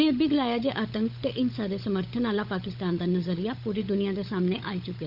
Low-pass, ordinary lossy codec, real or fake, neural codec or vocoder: 5.4 kHz; none; fake; codec, 16 kHz, 16 kbps, FunCodec, trained on LibriTTS, 50 frames a second